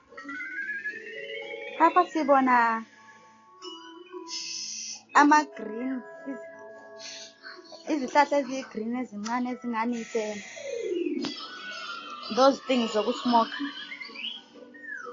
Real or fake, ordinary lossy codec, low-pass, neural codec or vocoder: real; AAC, 32 kbps; 7.2 kHz; none